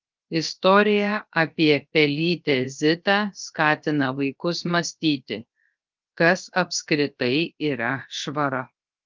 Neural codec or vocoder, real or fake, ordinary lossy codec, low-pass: codec, 16 kHz, 0.7 kbps, FocalCodec; fake; Opus, 24 kbps; 7.2 kHz